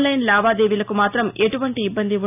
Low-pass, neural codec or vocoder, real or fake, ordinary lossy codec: 3.6 kHz; none; real; AAC, 32 kbps